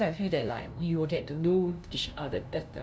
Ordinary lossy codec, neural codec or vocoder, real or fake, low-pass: none; codec, 16 kHz, 0.5 kbps, FunCodec, trained on LibriTTS, 25 frames a second; fake; none